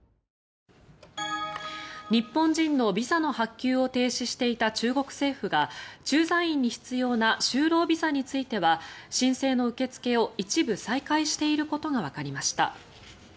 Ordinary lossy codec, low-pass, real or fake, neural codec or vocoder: none; none; real; none